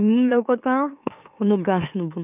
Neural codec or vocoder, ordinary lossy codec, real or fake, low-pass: autoencoder, 44.1 kHz, a latent of 192 numbers a frame, MeloTTS; none; fake; 3.6 kHz